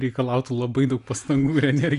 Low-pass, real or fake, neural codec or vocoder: 10.8 kHz; real; none